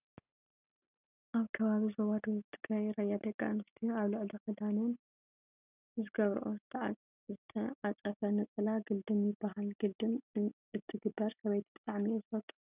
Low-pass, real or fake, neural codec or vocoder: 3.6 kHz; real; none